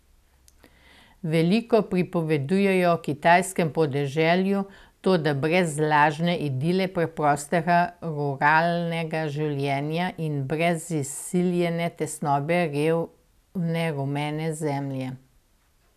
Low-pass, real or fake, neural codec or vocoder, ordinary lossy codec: 14.4 kHz; real; none; none